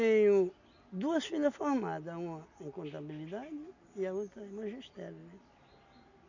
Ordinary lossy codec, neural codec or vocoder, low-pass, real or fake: none; none; 7.2 kHz; real